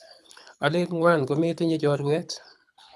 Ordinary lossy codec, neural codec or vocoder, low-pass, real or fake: none; codec, 24 kHz, 6 kbps, HILCodec; none; fake